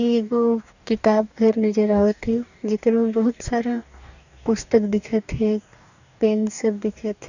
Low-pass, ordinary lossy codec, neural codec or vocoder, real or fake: 7.2 kHz; none; codec, 44.1 kHz, 2.6 kbps, DAC; fake